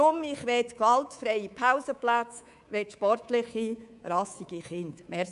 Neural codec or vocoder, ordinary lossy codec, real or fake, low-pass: codec, 24 kHz, 3.1 kbps, DualCodec; none; fake; 10.8 kHz